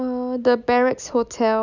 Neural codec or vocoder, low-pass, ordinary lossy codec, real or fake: none; 7.2 kHz; none; real